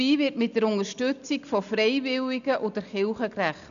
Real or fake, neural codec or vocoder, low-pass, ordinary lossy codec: real; none; 7.2 kHz; none